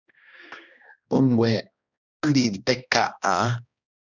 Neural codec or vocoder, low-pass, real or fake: codec, 16 kHz, 1 kbps, X-Codec, HuBERT features, trained on balanced general audio; 7.2 kHz; fake